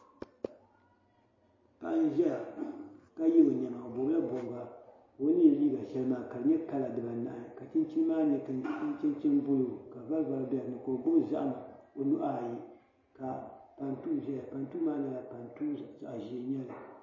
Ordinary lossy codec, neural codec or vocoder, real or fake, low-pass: MP3, 32 kbps; none; real; 7.2 kHz